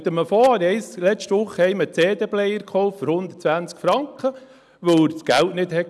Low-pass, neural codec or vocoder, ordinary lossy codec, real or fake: none; none; none; real